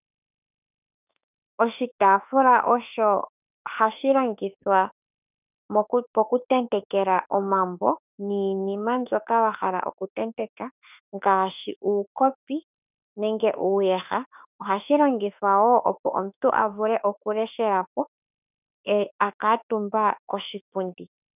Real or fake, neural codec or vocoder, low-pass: fake; autoencoder, 48 kHz, 32 numbers a frame, DAC-VAE, trained on Japanese speech; 3.6 kHz